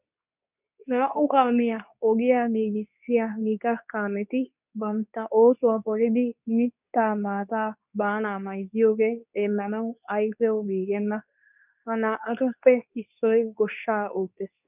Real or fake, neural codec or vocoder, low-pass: fake; codec, 24 kHz, 0.9 kbps, WavTokenizer, medium speech release version 2; 3.6 kHz